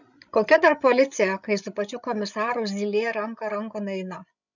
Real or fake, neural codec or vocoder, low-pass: fake; codec, 16 kHz, 16 kbps, FreqCodec, larger model; 7.2 kHz